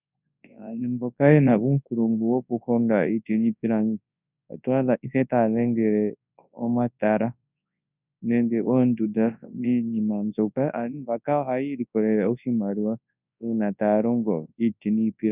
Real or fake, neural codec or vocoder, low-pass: fake; codec, 24 kHz, 0.9 kbps, WavTokenizer, large speech release; 3.6 kHz